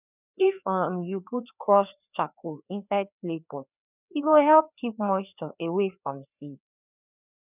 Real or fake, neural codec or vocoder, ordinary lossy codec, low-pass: fake; codec, 16 kHz, 2 kbps, FreqCodec, larger model; none; 3.6 kHz